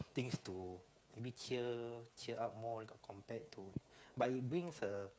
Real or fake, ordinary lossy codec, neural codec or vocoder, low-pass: fake; none; codec, 16 kHz, 8 kbps, FreqCodec, smaller model; none